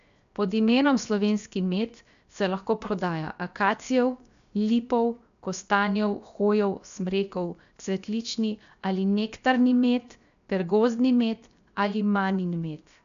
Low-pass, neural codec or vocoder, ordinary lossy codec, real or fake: 7.2 kHz; codec, 16 kHz, 0.7 kbps, FocalCodec; AAC, 96 kbps; fake